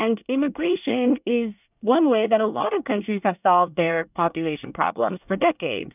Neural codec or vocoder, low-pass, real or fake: codec, 24 kHz, 1 kbps, SNAC; 3.6 kHz; fake